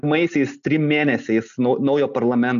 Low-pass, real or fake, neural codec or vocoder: 7.2 kHz; real; none